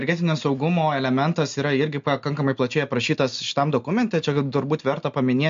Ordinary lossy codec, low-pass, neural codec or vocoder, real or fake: MP3, 48 kbps; 7.2 kHz; none; real